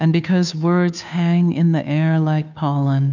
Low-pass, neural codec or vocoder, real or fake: 7.2 kHz; codec, 16 kHz, 2 kbps, FunCodec, trained on Chinese and English, 25 frames a second; fake